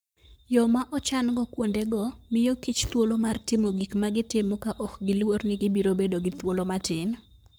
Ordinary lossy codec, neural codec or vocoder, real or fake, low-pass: none; codec, 44.1 kHz, 7.8 kbps, Pupu-Codec; fake; none